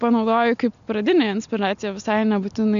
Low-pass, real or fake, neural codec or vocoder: 7.2 kHz; real; none